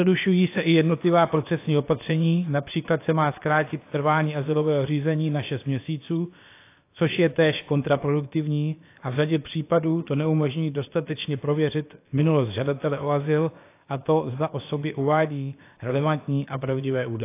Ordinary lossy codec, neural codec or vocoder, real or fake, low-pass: AAC, 24 kbps; codec, 16 kHz, about 1 kbps, DyCAST, with the encoder's durations; fake; 3.6 kHz